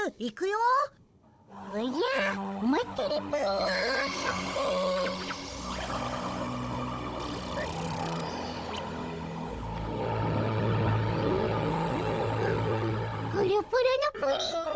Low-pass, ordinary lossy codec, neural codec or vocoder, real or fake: none; none; codec, 16 kHz, 16 kbps, FunCodec, trained on Chinese and English, 50 frames a second; fake